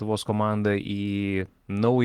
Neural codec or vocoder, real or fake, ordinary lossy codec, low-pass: none; real; Opus, 16 kbps; 19.8 kHz